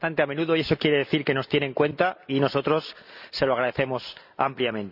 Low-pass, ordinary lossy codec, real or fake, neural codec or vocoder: 5.4 kHz; none; real; none